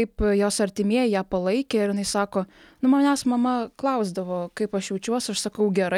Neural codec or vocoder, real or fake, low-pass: none; real; 19.8 kHz